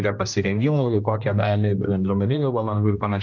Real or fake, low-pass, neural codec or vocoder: fake; 7.2 kHz; codec, 16 kHz, 1 kbps, X-Codec, HuBERT features, trained on general audio